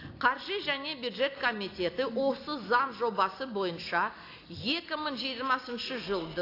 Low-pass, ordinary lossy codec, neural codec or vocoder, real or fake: 5.4 kHz; AAC, 32 kbps; none; real